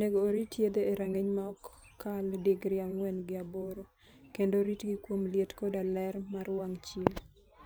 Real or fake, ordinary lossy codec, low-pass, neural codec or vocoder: fake; none; none; vocoder, 44.1 kHz, 128 mel bands every 512 samples, BigVGAN v2